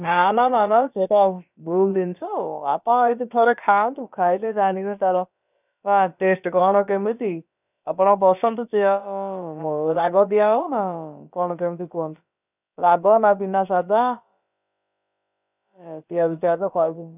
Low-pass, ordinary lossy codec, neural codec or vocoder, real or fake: 3.6 kHz; none; codec, 16 kHz, about 1 kbps, DyCAST, with the encoder's durations; fake